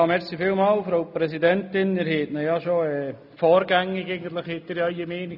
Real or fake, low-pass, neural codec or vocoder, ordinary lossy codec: real; 5.4 kHz; none; none